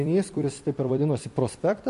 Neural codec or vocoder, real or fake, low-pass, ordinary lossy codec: vocoder, 44.1 kHz, 128 mel bands every 256 samples, BigVGAN v2; fake; 14.4 kHz; MP3, 48 kbps